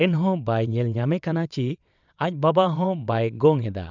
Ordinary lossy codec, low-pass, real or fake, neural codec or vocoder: none; 7.2 kHz; fake; vocoder, 22.05 kHz, 80 mel bands, WaveNeXt